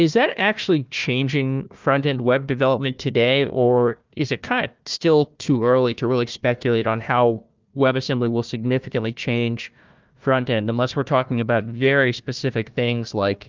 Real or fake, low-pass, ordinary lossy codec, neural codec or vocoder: fake; 7.2 kHz; Opus, 24 kbps; codec, 16 kHz, 1 kbps, FunCodec, trained on Chinese and English, 50 frames a second